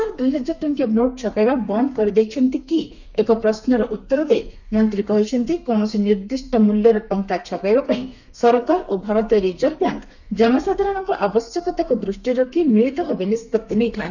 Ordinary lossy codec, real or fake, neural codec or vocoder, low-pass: none; fake; codec, 32 kHz, 1.9 kbps, SNAC; 7.2 kHz